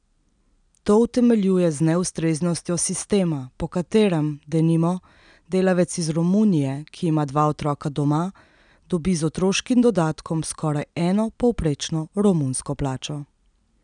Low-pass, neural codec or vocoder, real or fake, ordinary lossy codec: 9.9 kHz; none; real; none